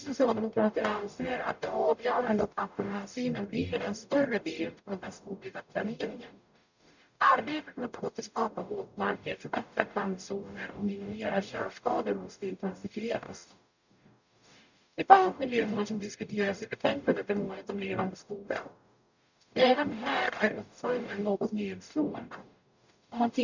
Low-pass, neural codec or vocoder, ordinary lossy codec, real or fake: 7.2 kHz; codec, 44.1 kHz, 0.9 kbps, DAC; none; fake